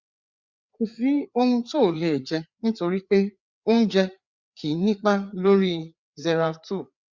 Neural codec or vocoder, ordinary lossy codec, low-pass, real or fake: codec, 16 kHz, 4 kbps, FreqCodec, larger model; Opus, 64 kbps; 7.2 kHz; fake